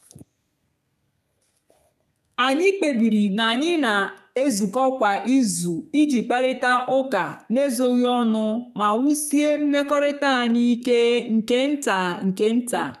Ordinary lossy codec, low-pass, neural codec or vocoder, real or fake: none; 14.4 kHz; codec, 32 kHz, 1.9 kbps, SNAC; fake